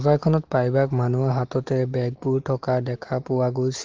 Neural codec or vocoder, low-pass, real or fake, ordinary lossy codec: none; 7.2 kHz; real; Opus, 16 kbps